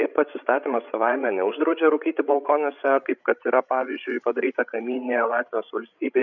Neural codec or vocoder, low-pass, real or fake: codec, 16 kHz, 16 kbps, FreqCodec, larger model; 7.2 kHz; fake